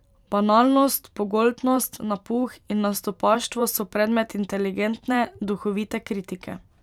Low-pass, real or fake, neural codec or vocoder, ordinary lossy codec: 19.8 kHz; fake; vocoder, 44.1 kHz, 128 mel bands every 512 samples, BigVGAN v2; none